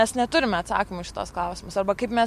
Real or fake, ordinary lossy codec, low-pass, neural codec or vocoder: real; MP3, 64 kbps; 14.4 kHz; none